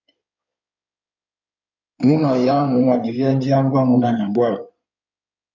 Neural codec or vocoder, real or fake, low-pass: codec, 16 kHz in and 24 kHz out, 2.2 kbps, FireRedTTS-2 codec; fake; 7.2 kHz